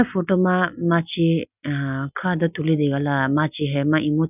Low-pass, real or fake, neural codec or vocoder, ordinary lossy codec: 3.6 kHz; real; none; none